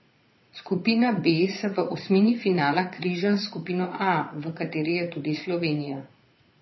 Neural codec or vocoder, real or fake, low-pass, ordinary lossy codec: vocoder, 22.05 kHz, 80 mel bands, Vocos; fake; 7.2 kHz; MP3, 24 kbps